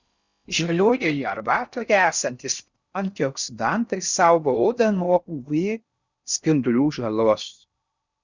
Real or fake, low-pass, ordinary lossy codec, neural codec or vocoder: fake; 7.2 kHz; Opus, 64 kbps; codec, 16 kHz in and 24 kHz out, 0.6 kbps, FocalCodec, streaming, 4096 codes